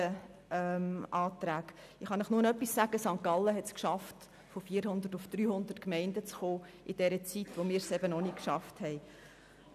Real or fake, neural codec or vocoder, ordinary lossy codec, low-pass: real; none; none; 14.4 kHz